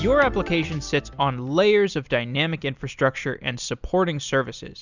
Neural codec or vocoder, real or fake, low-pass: none; real; 7.2 kHz